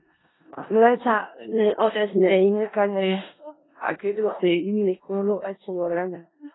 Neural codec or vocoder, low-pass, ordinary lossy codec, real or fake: codec, 16 kHz in and 24 kHz out, 0.4 kbps, LongCat-Audio-Codec, four codebook decoder; 7.2 kHz; AAC, 16 kbps; fake